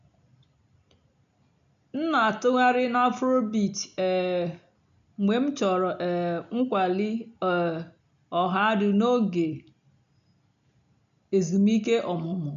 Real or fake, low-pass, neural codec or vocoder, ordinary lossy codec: real; 7.2 kHz; none; none